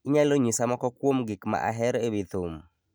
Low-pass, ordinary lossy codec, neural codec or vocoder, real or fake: none; none; none; real